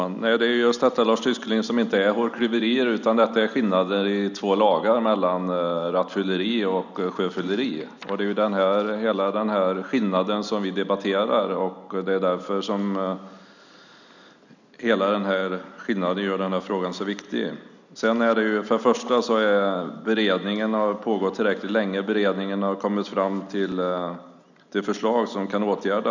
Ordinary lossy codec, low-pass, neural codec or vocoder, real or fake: none; 7.2 kHz; none; real